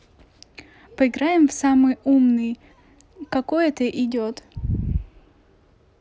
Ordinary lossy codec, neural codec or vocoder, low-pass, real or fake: none; none; none; real